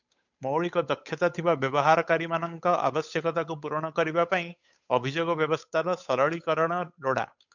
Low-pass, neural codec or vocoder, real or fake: 7.2 kHz; codec, 16 kHz, 8 kbps, FunCodec, trained on Chinese and English, 25 frames a second; fake